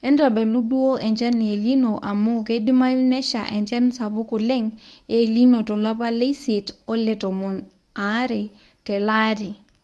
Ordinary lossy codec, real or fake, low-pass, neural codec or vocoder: none; fake; none; codec, 24 kHz, 0.9 kbps, WavTokenizer, medium speech release version 1